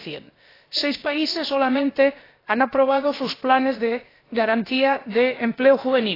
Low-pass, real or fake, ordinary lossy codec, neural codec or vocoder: 5.4 kHz; fake; AAC, 24 kbps; codec, 16 kHz, about 1 kbps, DyCAST, with the encoder's durations